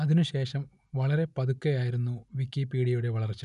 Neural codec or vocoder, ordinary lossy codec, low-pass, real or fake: none; none; 10.8 kHz; real